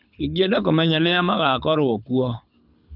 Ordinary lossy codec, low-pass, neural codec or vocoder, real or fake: none; 5.4 kHz; codec, 24 kHz, 6 kbps, HILCodec; fake